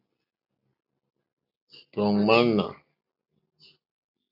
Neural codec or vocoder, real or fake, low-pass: none; real; 5.4 kHz